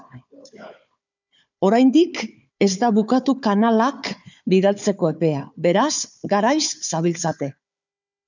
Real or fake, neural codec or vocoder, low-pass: fake; codec, 16 kHz, 4 kbps, FunCodec, trained on Chinese and English, 50 frames a second; 7.2 kHz